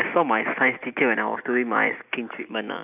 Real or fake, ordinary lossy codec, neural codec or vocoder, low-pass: real; none; none; 3.6 kHz